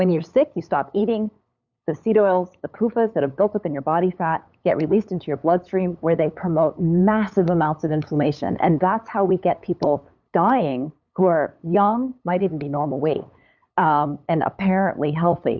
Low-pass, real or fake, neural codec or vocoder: 7.2 kHz; fake; codec, 16 kHz, 8 kbps, FunCodec, trained on LibriTTS, 25 frames a second